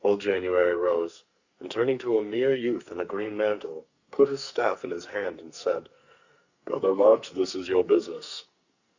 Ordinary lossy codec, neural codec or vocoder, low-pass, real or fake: Opus, 64 kbps; codec, 44.1 kHz, 2.6 kbps, SNAC; 7.2 kHz; fake